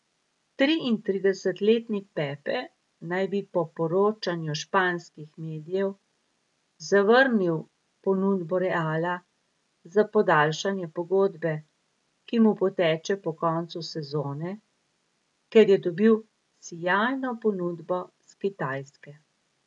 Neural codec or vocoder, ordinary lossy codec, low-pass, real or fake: none; none; 10.8 kHz; real